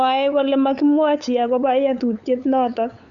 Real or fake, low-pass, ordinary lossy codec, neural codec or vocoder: fake; 7.2 kHz; Opus, 64 kbps; codec, 16 kHz, 8 kbps, FunCodec, trained on LibriTTS, 25 frames a second